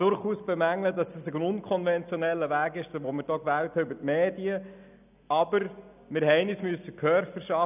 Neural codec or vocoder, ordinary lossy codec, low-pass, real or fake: none; none; 3.6 kHz; real